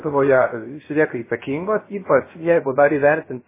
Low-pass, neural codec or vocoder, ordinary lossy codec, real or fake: 3.6 kHz; codec, 16 kHz, 0.3 kbps, FocalCodec; MP3, 16 kbps; fake